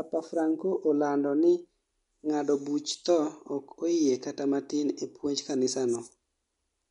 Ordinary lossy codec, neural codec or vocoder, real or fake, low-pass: MP3, 64 kbps; none; real; 10.8 kHz